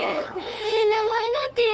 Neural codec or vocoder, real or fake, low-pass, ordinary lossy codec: codec, 16 kHz, 2 kbps, FunCodec, trained on LibriTTS, 25 frames a second; fake; none; none